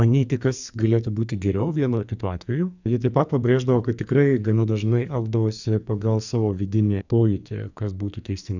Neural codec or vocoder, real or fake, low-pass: codec, 44.1 kHz, 2.6 kbps, SNAC; fake; 7.2 kHz